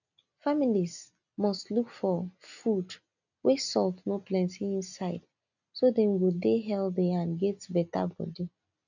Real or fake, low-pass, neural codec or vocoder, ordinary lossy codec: real; 7.2 kHz; none; none